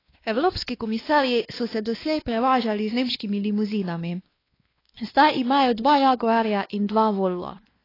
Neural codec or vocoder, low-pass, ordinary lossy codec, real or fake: codec, 16 kHz, 2 kbps, X-Codec, WavLM features, trained on Multilingual LibriSpeech; 5.4 kHz; AAC, 24 kbps; fake